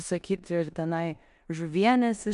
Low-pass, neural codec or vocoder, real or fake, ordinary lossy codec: 10.8 kHz; codec, 16 kHz in and 24 kHz out, 0.9 kbps, LongCat-Audio-Codec, four codebook decoder; fake; AAC, 96 kbps